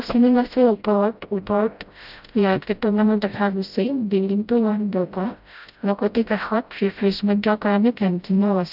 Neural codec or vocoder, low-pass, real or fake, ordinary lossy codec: codec, 16 kHz, 0.5 kbps, FreqCodec, smaller model; 5.4 kHz; fake; none